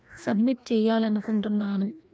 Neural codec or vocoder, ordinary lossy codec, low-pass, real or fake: codec, 16 kHz, 1 kbps, FreqCodec, larger model; none; none; fake